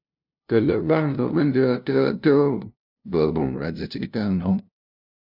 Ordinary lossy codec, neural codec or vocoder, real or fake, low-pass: AAC, 48 kbps; codec, 16 kHz, 0.5 kbps, FunCodec, trained on LibriTTS, 25 frames a second; fake; 5.4 kHz